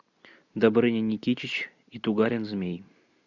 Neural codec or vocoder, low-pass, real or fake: none; 7.2 kHz; real